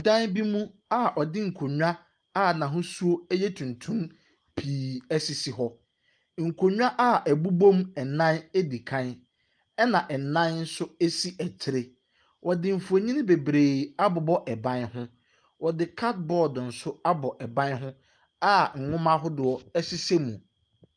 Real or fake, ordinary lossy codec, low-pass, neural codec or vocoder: real; Opus, 32 kbps; 9.9 kHz; none